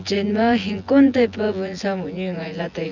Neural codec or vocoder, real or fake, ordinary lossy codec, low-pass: vocoder, 24 kHz, 100 mel bands, Vocos; fake; none; 7.2 kHz